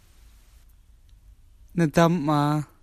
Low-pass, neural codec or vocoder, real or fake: 14.4 kHz; none; real